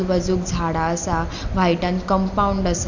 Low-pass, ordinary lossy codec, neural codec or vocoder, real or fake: 7.2 kHz; none; none; real